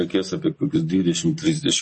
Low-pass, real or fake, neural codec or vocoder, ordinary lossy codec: 10.8 kHz; real; none; MP3, 32 kbps